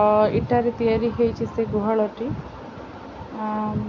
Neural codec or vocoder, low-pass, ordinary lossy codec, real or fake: none; 7.2 kHz; AAC, 48 kbps; real